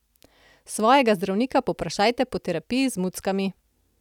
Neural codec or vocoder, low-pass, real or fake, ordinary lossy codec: none; 19.8 kHz; real; none